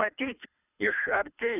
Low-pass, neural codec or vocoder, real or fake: 3.6 kHz; codec, 24 kHz, 3 kbps, HILCodec; fake